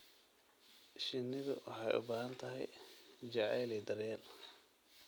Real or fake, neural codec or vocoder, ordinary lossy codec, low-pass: real; none; none; none